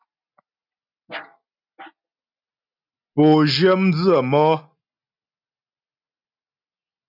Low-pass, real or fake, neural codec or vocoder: 5.4 kHz; real; none